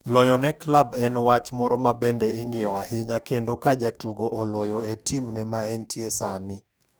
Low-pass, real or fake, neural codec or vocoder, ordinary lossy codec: none; fake; codec, 44.1 kHz, 2.6 kbps, DAC; none